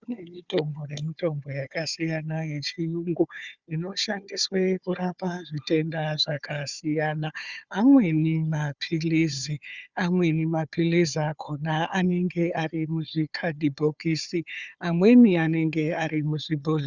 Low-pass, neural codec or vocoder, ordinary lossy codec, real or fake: 7.2 kHz; codec, 16 kHz, 4 kbps, FunCodec, trained on Chinese and English, 50 frames a second; Opus, 64 kbps; fake